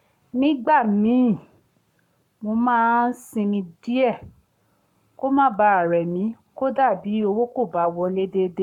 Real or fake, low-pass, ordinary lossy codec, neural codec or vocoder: fake; 19.8 kHz; MP3, 96 kbps; codec, 44.1 kHz, 7.8 kbps, Pupu-Codec